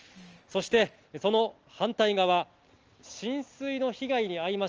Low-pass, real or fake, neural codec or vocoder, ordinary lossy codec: 7.2 kHz; real; none; Opus, 24 kbps